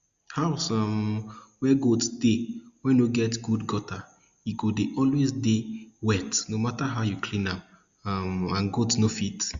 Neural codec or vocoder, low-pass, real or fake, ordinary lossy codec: none; 7.2 kHz; real; Opus, 64 kbps